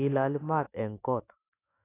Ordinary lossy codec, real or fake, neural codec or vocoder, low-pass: AAC, 24 kbps; fake; autoencoder, 48 kHz, 128 numbers a frame, DAC-VAE, trained on Japanese speech; 3.6 kHz